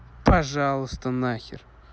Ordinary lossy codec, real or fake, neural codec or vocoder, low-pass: none; real; none; none